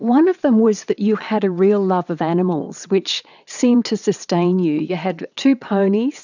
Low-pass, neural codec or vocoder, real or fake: 7.2 kHz; vocoder, 22.05 kHz, 80 mel bands, WaveNeXt; fake